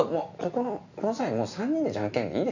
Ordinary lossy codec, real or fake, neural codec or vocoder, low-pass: none; real; none; 7.2 kHz